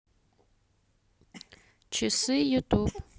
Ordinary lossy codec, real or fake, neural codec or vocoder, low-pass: none; real; none; none